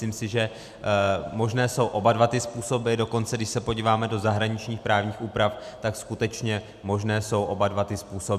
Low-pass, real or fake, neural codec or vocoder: 14.4 kHz; real; none